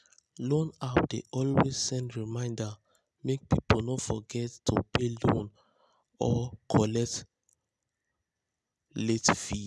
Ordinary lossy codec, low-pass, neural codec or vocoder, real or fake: none; none; none; real